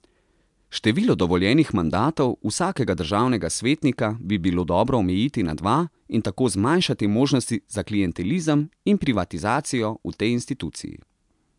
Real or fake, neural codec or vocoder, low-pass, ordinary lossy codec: real; none; 10.8 kHz; MP3, 96 kbps